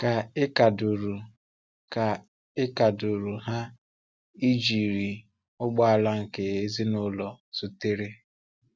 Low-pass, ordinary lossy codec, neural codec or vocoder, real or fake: none; none; none; real